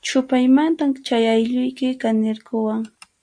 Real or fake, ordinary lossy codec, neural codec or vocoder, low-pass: real; AAC, 64 kbps; none; 9.9 kHz